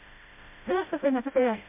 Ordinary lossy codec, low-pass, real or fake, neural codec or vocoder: MP3, 32 kbps; 3.6 kHz; fake; codec, 16 kHz, 0.5 kbps, FreqCodec, smaller model